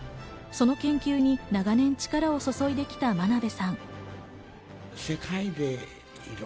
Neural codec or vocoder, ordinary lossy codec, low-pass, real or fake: none; none; none; real